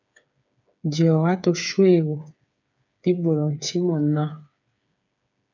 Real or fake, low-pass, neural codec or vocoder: fake; 7.2 kHz; codec, 16 kHz, 8 kbps, FreqCodec, smaller model